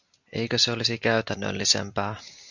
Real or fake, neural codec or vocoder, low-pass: real; none; 7.2 kHz